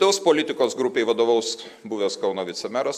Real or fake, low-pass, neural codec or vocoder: real; 14.4 kHz; none